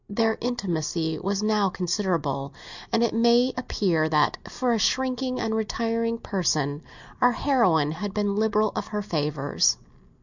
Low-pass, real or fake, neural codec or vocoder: 7.2 kHz; real; none